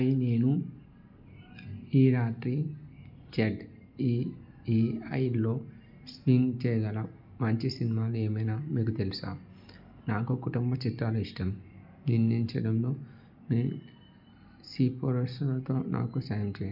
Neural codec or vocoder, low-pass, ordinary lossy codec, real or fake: none; 5.4 kHz; none; real